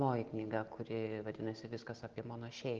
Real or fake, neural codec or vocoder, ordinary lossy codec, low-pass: real; none; Opus, 16 kbps; 7.2 kHz